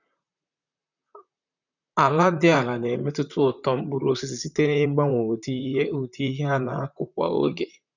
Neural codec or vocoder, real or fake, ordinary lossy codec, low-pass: vocoder, 44.1 kHz, 128 mel bands, Pupu-Vocoder; fake; none; 7.2 kHz